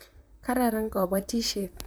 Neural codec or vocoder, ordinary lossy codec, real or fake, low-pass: vocoder, 44.1 kHz, 128 mel bands, Pupu-Vocoder; none; fake; none